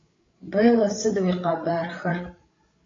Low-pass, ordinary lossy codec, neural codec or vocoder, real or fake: 7.2 kHz; AAC, 32 kbps; codec, 16 kHz, 8 kbps, FreqCodec, larger model; fake